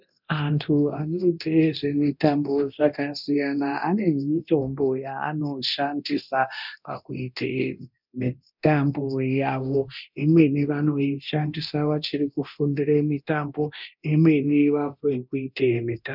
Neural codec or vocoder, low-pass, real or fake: codec, 24 kHz, 0.9 kbps, DualCodec; 5.4 kHz; fake